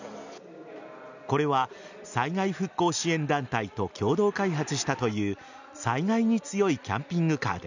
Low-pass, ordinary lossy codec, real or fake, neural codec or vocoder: 7.2 kHz; none; real; none